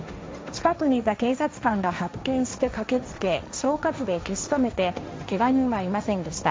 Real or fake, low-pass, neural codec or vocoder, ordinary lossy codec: fake; none; codec, 16 kHz, 1.1 kbps, Voila-Tokenizer; none